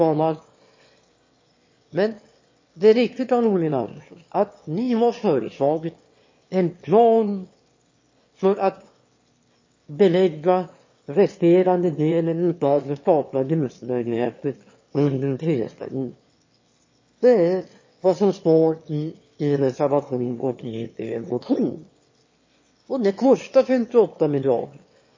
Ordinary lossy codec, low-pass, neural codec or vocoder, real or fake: MP3, 32 kbps; 7.2 kHz; autoencoder, 22.05 kHz, a latent of 192 numbers a frame, VITS, trained on one speaker; fake